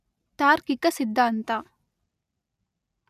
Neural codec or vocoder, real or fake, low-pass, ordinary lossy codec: none; real; 14.4 kHz; none